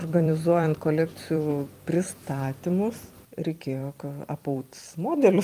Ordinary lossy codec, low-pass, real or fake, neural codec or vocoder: Opus, 32 kbps; 14.4 kHz; fake; vocoder, 44.1 kHz, 128 mel bands every 256 samples, BigVGAN v2